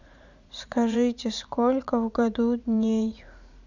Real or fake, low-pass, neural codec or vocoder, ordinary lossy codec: fake; 7.2 kHz; vocoder, 44.1 kHz, 80 mel bands, Vocos; none